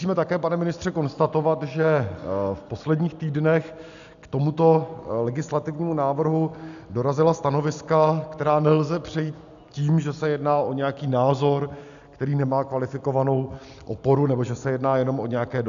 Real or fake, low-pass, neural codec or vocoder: real; 7.2 kHz; none